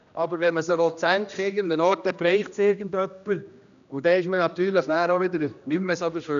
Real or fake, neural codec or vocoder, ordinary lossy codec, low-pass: fake; codec, 16 kHz, 1 kbps, X-Codec, HuBERT features, trained on general audio; none; 7.2 kHz